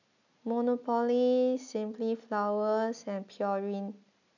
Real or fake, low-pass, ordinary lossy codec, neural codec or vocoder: real; 7.2 kHz; none; none